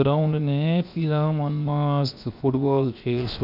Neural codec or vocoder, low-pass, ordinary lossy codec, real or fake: codec, 16 kHz, 0.7 kbps, FocalCodec; 5.4 kHz; none; fake